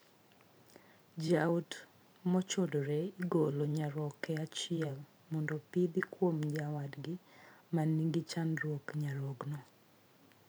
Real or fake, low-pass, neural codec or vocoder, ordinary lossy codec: fake; none; vocoder, 44.1 kHz, 128 mel bands every 512 samples, BigVGAN v2; none